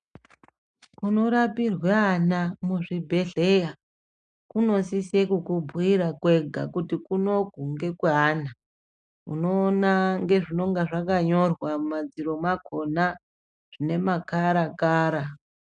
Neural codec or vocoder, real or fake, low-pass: none; real; 9.9 kHz